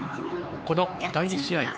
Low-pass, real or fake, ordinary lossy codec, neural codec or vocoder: none; fake; none; codec, 16 kHz, 4 kbps, X-Codec, HuBERT features, trained on LibriSpeech